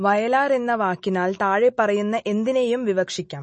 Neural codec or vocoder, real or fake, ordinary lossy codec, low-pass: none; real; MP3, 32 kbps; 10.8 kHz